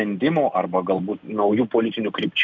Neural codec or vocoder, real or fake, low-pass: none; real; 7.2 kHz